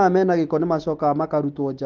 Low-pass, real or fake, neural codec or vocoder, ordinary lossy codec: 7.2 kHz; real; none; Opus, 16 kbps